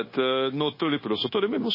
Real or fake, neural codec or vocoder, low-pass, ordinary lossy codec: fake; codec, 16 kHz, 0.9 kbps, LongCat-Audio-Codec; 5.4 kHz; MP3, 24 kbps